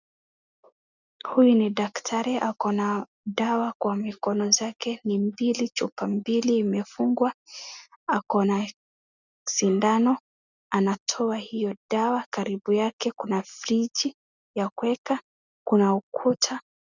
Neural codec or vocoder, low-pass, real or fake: none; 7.2 kHz; real